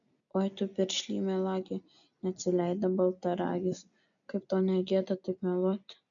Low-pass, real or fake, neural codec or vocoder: 7.2 kHz; real; none